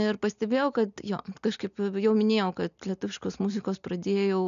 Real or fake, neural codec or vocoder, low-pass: real; none; 7.2 kHz